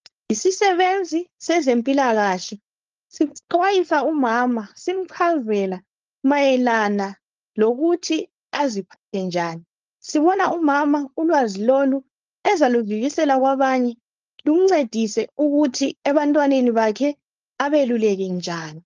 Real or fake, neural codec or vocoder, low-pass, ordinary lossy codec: fake; codec, 16 kHz, 4.8 kbps, FACodec; 7.2 kHz; Opus, 24 kbps